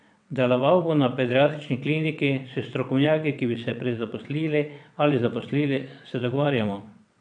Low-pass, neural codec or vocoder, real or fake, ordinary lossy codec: 9.9 kHz; vocoder, 22.05 kHz, 80 mel bands, WaveNeXt; fake; none